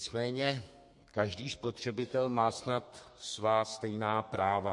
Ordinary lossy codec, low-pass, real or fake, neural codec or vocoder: MP3, 48 kbps; 10.8 kHz; fake; codec, 32 kHz, 1.9 kbps, SNAC